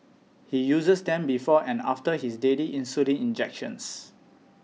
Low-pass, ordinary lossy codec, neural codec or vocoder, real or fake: none; none; none; real